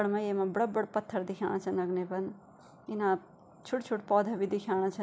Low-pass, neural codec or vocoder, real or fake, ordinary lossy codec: none; none; real; none